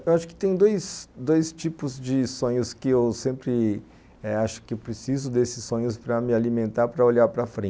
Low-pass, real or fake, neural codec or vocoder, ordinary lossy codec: none; real; none; none